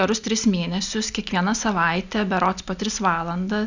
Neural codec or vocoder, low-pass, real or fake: none; 7.2 kHz; real